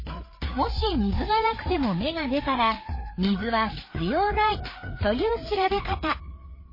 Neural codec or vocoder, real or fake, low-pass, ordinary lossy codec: codec, 16 kHz, 4 kbps, FreqCodec, larger model; fake; 5.4 kHz; MP3, 24 kbps